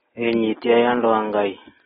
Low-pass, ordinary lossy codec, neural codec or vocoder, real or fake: 19.8 kHz; AAC, 16 kbps; none; real